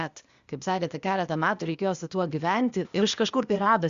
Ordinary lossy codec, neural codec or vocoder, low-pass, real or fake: Opus, 64 kbps; codec, 16 kHz, 0.8 kbps, ZipCodec; 7.2 kHz; fake